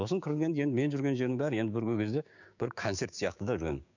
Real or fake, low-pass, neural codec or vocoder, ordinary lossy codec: fake; 7.2 kHz; codec, 16 kHz, 6 kbps, DAC; none